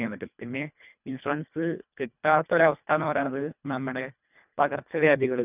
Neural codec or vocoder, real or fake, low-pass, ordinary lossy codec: codec, 24 kHz, 1.5 kbps, HILCodec; fake; 3.6 kHz; none